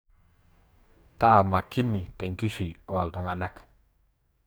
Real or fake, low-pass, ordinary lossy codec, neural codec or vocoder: fake; none; none; codec, 44.1 kHz, 2.6 kbps, DAC